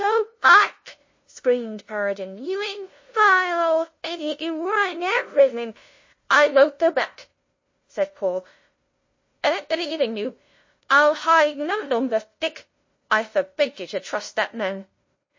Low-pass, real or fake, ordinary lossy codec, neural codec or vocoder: 7.2 kHz; fake; MP3, 32 kbps; codec, 16 kHz, 0.5 kbps, FunCodec, trained on LibriTTS, 25 frames a second